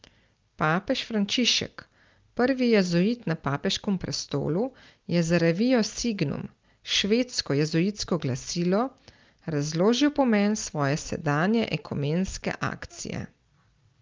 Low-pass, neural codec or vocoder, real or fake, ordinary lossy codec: 7.2 kHz; none; real; Opus, 32 kbps